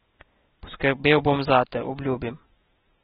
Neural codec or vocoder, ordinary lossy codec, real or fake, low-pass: none; AAC, 16 kbps; real; 19.8 kHz